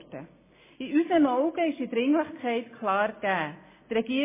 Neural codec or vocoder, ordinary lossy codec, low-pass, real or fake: none; MP3, 16 kbps; 3.6 kHz; real